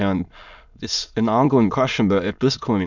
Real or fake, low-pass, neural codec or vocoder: fake; 7.2 kHz; autoencoder, 22.05 kHz, a latent of 192 numbers a frame, VITS, trained on many speakers